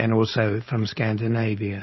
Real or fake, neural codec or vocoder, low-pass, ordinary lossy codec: fake; codec, 16 kHz, 4.8 kbps, FACodec; 7.2 kHz; MP3, 24 kbps